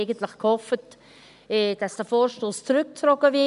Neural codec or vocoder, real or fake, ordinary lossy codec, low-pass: none; real; none; 10.8 kHz